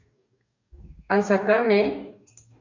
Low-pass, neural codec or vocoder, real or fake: 7.2 kHz; codec, 44.1 kHz, 2.6 kbps, DAC; fake